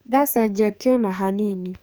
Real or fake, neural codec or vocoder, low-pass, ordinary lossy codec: fake; codec, 44.1 kHz, 2.6 kbps, SNAC; none; none